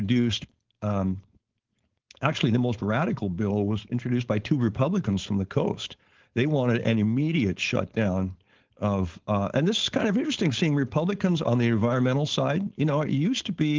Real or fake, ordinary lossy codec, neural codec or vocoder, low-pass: fake; Opus, 16 kbps; codec, 16 kHz, 4.8 kbps, FACodec; 7.2 kHz